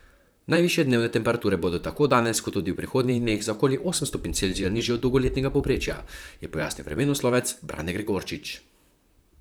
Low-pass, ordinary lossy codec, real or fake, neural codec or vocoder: none; none; fake; vocoder, 44.1 kHz, 128 mel bands, Pupu-Vocoder